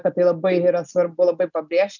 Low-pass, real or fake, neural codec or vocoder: 7.2 kHz; real; none